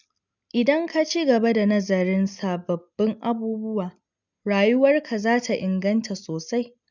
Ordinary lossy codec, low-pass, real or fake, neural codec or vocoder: none; 7.2 kHz; real; none